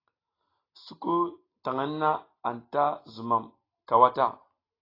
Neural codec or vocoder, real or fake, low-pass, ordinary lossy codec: none; real; 5.4 kHz; AAC, 24 kbps